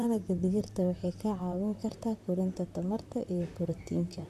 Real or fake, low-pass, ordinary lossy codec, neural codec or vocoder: fake; 14.4 kHz; AAC, 96 kbps; vocoder, 44.1 kHz, 128 mel bands, Pupu-Vocoder